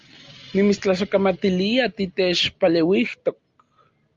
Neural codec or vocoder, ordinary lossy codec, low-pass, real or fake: none; Opus, 32 kbps; 7.2 kHz; real